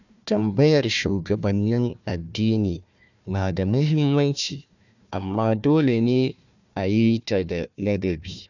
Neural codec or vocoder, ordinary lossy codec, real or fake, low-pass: codec, 16 kHz, 1 kbps, FunCodec, trained on Chinese and English, 50 frames a second; none; fake; 7.2 kHz